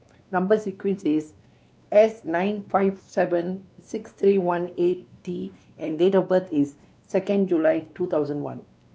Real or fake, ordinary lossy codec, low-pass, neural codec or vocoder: fake; none; none; codec, 16 kHz, 2 kbps, X-Codec, WavLM features, trained on Multilingual LibriSpeech